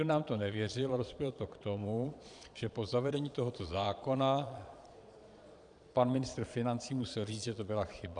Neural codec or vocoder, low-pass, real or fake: vocoder, 22.05 kHz, 80 mel bands, Vocos; 9.9 kHz; fake